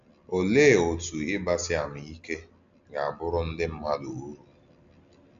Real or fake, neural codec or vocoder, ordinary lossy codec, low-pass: real; none; AAC, 64 kbps; 7.2 kHz